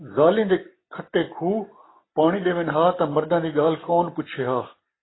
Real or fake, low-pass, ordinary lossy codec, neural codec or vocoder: real; 7.2 kHz; AAC, 16 kbps; none